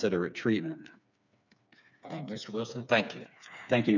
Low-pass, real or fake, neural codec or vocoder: 7.2 kHz; fake; codec, 16 kHz, 4 kbps, FreqCodec, smaller model